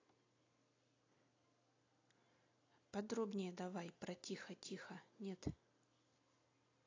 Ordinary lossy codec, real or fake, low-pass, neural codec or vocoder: AAC, 32 kbps; real; 7.2 kHz; none